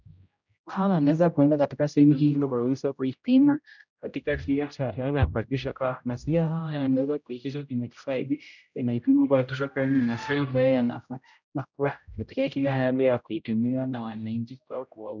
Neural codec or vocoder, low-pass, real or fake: codec, 16 kHz, 0.5 kbps, X-Codec, HuBERT features, trained on general audio; 7.2 kHz; fake